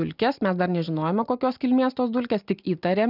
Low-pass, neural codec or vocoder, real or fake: 5.4 kHz; none; real